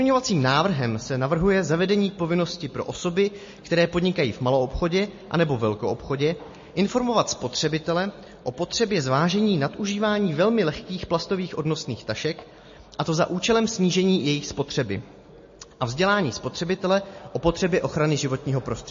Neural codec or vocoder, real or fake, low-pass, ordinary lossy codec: none; real; 7.2 kHz; MP3, 32 kbps